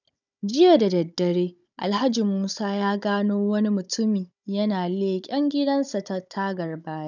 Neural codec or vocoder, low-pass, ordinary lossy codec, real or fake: codec, 16 kHz, 16 kbps, FunCodec, trained on Chinese and English, 50 frames a second; 7.2 kHz; none; fake